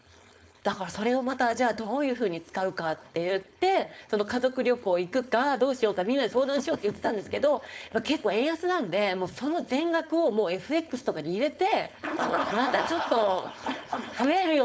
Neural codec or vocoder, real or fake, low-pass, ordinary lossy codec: codec, 16 kHz, 4.8 kbps, FACodec; fake; none; none